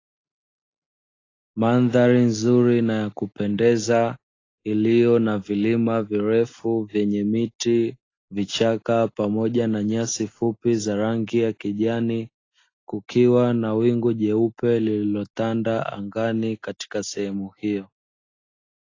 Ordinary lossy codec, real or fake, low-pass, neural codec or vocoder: AAC, 32 kbps; real; 7.2 kHz; none